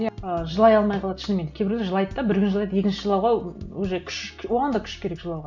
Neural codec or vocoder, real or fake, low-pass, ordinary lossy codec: none; real; 7.2 kHz; none